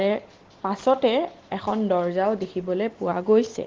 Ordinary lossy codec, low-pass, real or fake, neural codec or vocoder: Opus, 16 kbps; 7.2 kHz; real; none